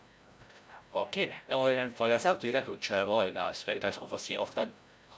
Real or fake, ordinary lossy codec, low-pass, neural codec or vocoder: fake; none; none; codec, 16 kHz, 0.5 kbps, FreqCodec, larger model